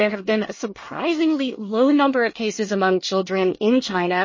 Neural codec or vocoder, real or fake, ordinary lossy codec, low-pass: codec, 24 kHz, 1 kbps, SNAC; fake; MP3, 32 kbps; 7.2 kHz